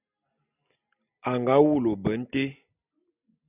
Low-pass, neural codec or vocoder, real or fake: 3.6 kHz; none; real